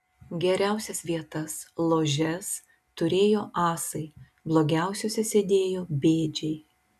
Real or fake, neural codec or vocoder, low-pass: real; none; 14.4 kHz